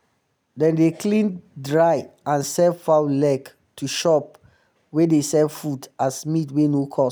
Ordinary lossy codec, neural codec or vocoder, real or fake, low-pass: none; none; real; none